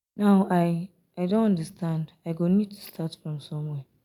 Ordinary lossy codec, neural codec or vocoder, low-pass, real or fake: none; none; 19.8 kHz; real